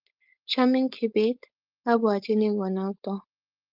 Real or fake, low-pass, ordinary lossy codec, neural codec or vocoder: fake; 5.4 kHz; Opus, 24 kbps; codec, 16 kHz, 4.8 kbps, FACodec